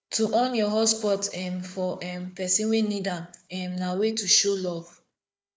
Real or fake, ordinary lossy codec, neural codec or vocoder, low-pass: fake; none; codec, 16 kHz, 4 kbps, FunCodec, trained on Chinese and English, 50 frames a second; none